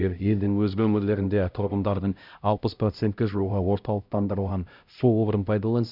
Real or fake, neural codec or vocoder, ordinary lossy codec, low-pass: fake; codec, 16 kHz, 0.5 kbps, X-Codec, HuBERT features, trained on LibriSpeech; none; 5.4 kHz